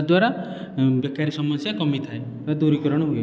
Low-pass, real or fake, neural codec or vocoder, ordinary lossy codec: none; real; none; none